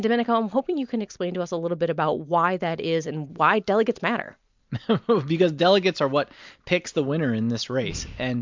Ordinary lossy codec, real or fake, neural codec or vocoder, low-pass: MP3, 64 kbps; real; none; 7.2 kHz